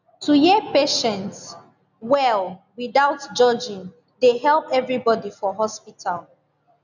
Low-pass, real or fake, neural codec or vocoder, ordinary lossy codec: 7.2 kHz; real; none; none